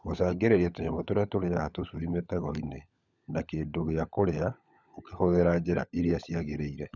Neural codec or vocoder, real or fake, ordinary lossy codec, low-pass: codec, 16 kHz, 16 kbps, FunCodec, trained on LibriTTS, 50 frames a second; fake; none; 7.2 kHz